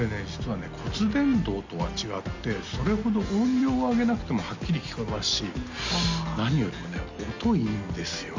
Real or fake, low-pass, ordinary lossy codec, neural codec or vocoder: real; 7.2 kHz; MP3, 64 kbps; none